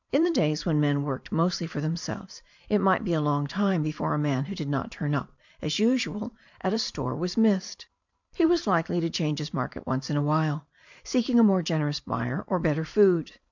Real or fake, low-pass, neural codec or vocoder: fake; 7.2 kHz; vocoder, 44.1 kHz, 128 mel bands every 512 samples, BigVGAN v2